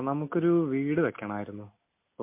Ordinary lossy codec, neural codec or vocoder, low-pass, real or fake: MP3, 24 kbps; none; 3.6 kHz; real